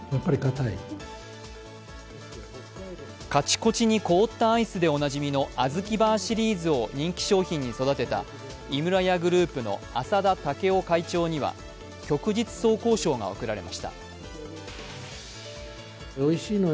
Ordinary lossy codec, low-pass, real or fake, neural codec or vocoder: none; none; real; none